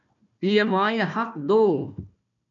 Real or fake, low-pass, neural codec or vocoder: fake; 7.2 kHz; codec, 16 kHz, 1 kbps, FunCodec, trained on Chinese and English, 50 frames a second